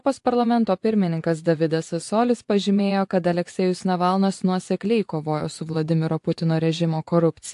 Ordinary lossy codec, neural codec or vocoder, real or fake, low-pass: AAC, 64 kbps; vocoder, 24 kHz, 100 mel bands, Vocos; fake; 10.8 kHz